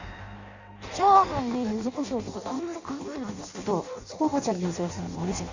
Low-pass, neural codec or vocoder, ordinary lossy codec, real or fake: 7.2 kHz; codec, 16 kHz in and 24 kHz out, 0.6 kbps, FireRedTTS-2 codec; Opus, 64 kbps; fake